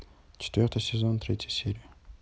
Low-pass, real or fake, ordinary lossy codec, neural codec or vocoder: none; real; none; none